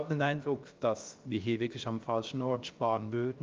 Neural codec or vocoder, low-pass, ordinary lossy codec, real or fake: codec, 16 kHz, about 1 kbps, DyCAST, with the encoder's durations; 7.2 kHz; Opus, 24 kbps; fake